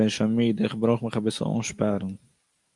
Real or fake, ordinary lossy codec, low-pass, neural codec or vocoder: real; Opus, 32 kbps; 10.8 kHz; none